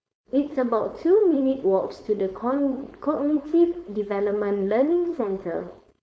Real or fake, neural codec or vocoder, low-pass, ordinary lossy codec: fake; codec, 16 kHz, 4.8 kbps, FACodec; none; none